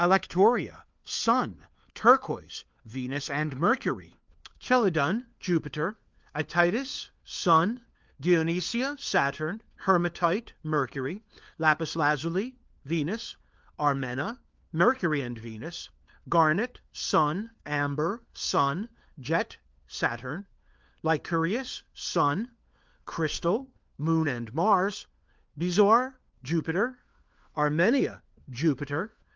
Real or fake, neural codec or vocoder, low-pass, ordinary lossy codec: fake; codec, 16 kHz, 4 kbps, FunCodec, trained on LibriTTS, 50 frames a second; 7.2 kHz; Opus, 32 kbps